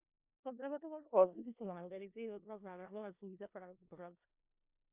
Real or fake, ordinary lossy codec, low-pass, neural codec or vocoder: fake; Opus, 64 kbps; 3.6 kHz; codec, 16 kHz in and 24 kHz out, 0.4 kbps, LongCat-Audio-Codec, four codebook decoder